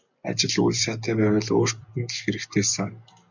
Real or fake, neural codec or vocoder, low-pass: real; none; 7.2 kHz